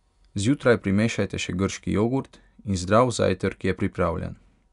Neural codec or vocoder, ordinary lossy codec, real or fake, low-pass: none; none; real; 10.8 kHz